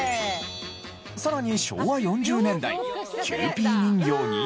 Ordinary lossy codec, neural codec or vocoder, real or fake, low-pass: none; none; real; none